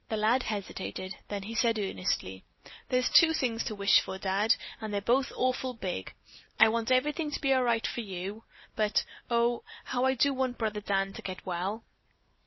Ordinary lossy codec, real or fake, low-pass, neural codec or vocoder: MP3, 24 kbps; real; 7.2 kHz; none